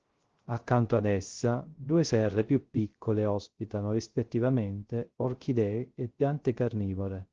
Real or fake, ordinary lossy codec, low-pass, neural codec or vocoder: fake; Opus, 16 kbps; 7.2 kHz; codec, 16 kHz, 0.3 kbps, FocalCodec